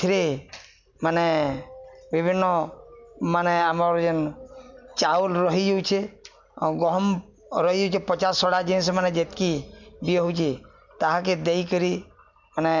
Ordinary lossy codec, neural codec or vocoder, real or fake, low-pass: none; none; real; 7.2 kHz